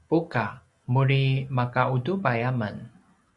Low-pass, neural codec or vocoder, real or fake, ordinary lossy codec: 10.8 kHz; none; real; MP3, 64 kbps